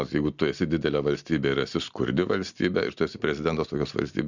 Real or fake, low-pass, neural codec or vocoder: real; 7.2 kHz; none